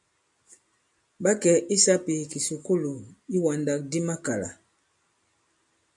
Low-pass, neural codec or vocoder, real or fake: 10.8 kHz; none; real